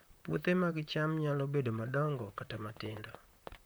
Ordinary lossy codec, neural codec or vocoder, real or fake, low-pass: none; vocoder, 44.1 kHz, 128 mel bands, Pupu-Vocoder; fake; none